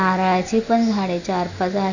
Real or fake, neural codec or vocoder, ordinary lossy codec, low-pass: real; none; none; 7.2 kHz